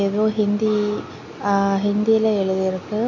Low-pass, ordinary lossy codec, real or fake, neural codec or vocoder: 7.2 kHz; MP3, 64 kbps; real; none